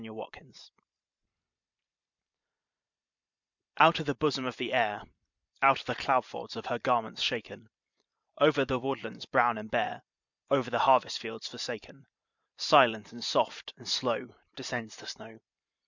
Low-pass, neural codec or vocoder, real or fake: 7.2 kHz; vocoder, 44.1 kHz, 128 mel bands every 512 samples, BigVGAN v2; fake